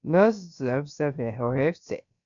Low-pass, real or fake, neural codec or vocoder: 7.2 kHz; fake; codec, 16 kHz, about 1 kbps, DyCAST, with the encoder's durations